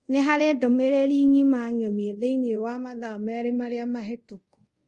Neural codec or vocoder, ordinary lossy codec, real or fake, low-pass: codec, 24 kHz, 0.5 kbps, DualCodec; Opus, 32 kbps; fake; 10.8 kHz